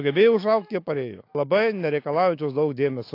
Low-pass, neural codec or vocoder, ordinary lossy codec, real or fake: 5.4 kHz; autoencoder, 48 kHz, 128 numbers a frame, DAC-VAE, trained on Japanese speech; AAC, 32 kbps; fake